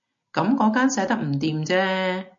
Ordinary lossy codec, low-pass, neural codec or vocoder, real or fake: MP3, 48 kbps; 7.2 kHz; none; real